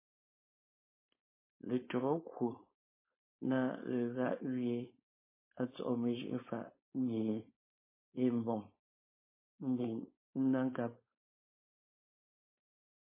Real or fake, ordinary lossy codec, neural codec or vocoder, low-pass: fake; MP3, 16 kbps; codec, 16 kHz, 4.8 kbps, FACodec; 3.6 kHz